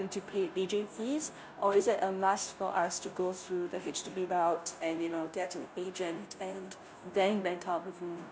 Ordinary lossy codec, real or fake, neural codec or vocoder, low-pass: none; fake; codec, 16 kHz, 0.5 kbps, FunCodec, trained on Chinese and English, 25 frames a second; none